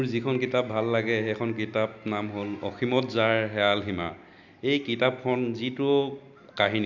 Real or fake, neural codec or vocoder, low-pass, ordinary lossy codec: real; none; 7.2 kHz; none